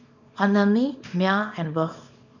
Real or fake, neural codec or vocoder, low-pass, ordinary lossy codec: fake; codec, 24 kHz, 0.9 kbps, WavTokenizer, small release; 7.2 kHz; none